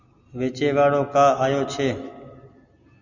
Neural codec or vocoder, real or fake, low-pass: none; real; 7.2 kHz